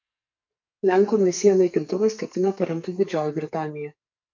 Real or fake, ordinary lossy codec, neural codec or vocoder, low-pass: fake; MP3, 48 kbps; codec, 44.1 kHz, 2.6 kbps, SNAC; 7.2 kHz